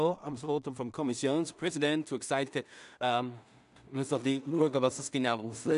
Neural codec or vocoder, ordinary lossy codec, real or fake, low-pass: codec, 16 kHz in and 24 kHz out, 0.4 kbps, LongCat-Audio-Codec, two codebook decoder; none; fake; 10.8 kHz